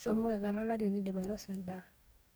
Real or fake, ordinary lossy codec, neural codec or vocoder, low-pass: fake; none; codec, 44.1 kHz, 2.6 kbps, DAC; none